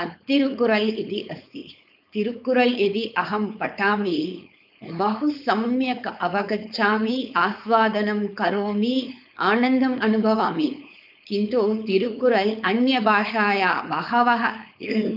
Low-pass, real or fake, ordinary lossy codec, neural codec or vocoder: 5.4 kHz; fake; none; codec, 16 kHz, 4.8 kbps, FACodec